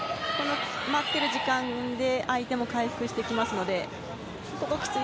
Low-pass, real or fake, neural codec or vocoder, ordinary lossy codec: none; real; none; none